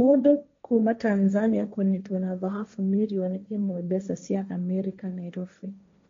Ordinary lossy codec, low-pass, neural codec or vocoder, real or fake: MP3, 48 kbps; 7.2 kHz; codec, 16 kHz, 1.1 kbps, Voila-Tokenizer; fake